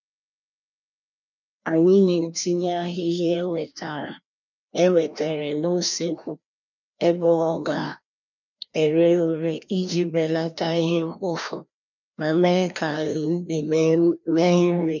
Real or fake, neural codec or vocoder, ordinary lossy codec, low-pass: fake; codec, 16 kHz, 1 kbps, FreqCodec, larger model; none; 7.2 kHz